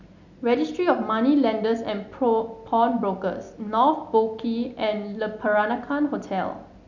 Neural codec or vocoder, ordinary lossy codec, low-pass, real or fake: none; none; 7.2 kHz; real